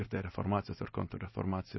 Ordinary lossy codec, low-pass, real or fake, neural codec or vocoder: MP3, 24 kbps; 7.2 kHz; real; none